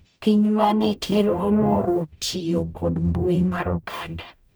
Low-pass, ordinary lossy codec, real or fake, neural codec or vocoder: none; none; fake; codec, 44.1 kHz, 0.9 kbps, DAC